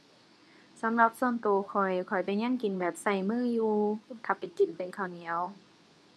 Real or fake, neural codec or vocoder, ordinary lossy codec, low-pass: fake; codec, 24 kHz, 0.9 kbps, WavTokenizer, medium speech release version 2; none; none